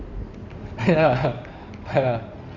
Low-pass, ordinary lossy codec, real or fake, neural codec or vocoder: 7.2 kHz; none; fake; codec, 16 kHz in and 24 kHz out, 2.2 kbps, FireRedTTS-2 codec